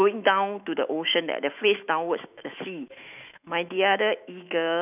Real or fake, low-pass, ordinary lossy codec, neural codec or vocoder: fake; 3.6 kHz; none; autoencoder, 48 kHz, 128 numbers a frame, DAC-VAE, trained on Japanese speech